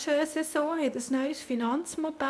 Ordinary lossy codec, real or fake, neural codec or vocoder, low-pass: none; fake; codec, 24 kHz, 0.9 kbps, WavTokenizer, medium speech release version 2; none